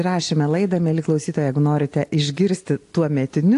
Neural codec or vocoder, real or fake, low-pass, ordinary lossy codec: none; real; 10.8 kHz; AAC, 48 kbps